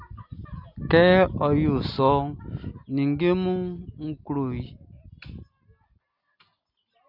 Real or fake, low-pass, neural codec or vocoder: real; 5.4 kHz; none